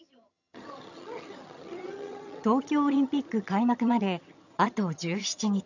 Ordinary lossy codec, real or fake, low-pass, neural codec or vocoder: none; fake; 7.2 kHz; vocoder, 22.05 kHz, 80 mel bands, HiFi-GAN